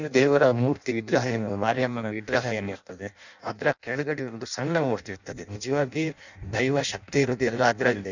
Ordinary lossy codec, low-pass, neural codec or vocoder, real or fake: none; 7.2 kHz; codec, 16 kHz in and 24 kHz out, 0.6 kbps, FireRedTTS-2 codec; fake